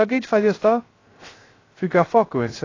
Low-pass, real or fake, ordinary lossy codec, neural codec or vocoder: 7.2 kHz; fake; AAC, 32 kbps; codec, 16 kHz, 0.3 kbps, FocalCodec